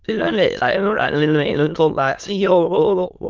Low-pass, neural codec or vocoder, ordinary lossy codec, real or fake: 7.2 kHz; autoencoder, 22.05 kHz, a latent of 192 numbers a frame, VITS, trained on many speakers; Opus, 32 kbps; fake